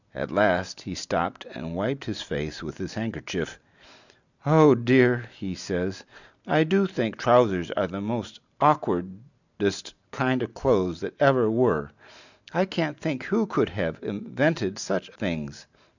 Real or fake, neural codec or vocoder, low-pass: real; none; 7.2 kHz